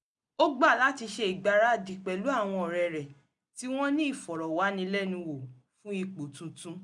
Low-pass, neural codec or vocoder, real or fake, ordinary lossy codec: 10.8 kHz; none; real; none